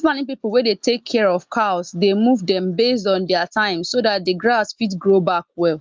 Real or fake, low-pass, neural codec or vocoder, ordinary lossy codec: real; 7.2 kHz; none; Opus, 32 kbps